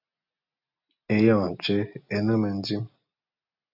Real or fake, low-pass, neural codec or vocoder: real; 5.4 kHz; none